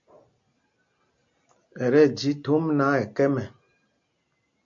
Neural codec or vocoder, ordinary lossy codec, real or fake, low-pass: none; MP3, 48 kbps; real; 7.2 kHz